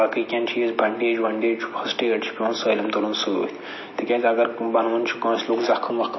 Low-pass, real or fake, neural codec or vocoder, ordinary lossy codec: 7.2 kHz; real; none; MP3, 24 kbps